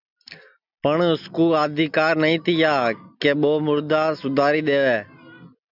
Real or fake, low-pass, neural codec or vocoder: real; 5.4 kHz; none